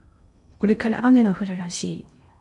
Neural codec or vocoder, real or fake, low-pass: codec, 16 kHz in and 24 kHz out, 0.6 kbps, FocalCodec, streaming, 2048 codes; fake; 10.8 kHz